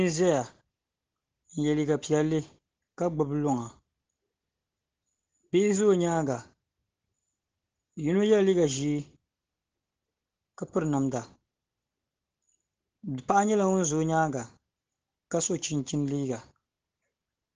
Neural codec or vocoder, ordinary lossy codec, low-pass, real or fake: none; Opus, 16 kbps; 7.2 kHz; real